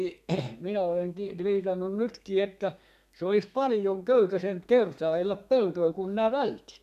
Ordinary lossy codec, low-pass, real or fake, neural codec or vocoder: none; 14.4 kHz; fake; codec, 32 kHz, 1.9 kbps, SNAC